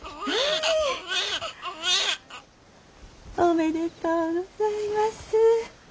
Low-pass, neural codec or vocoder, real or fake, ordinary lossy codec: none; none; real; none